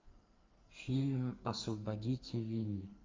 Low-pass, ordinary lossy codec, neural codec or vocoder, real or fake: 7.2 kHz; Opus, 32 kbps; codec, 44.1 kHz, 2.6 kbps, SNAC; fake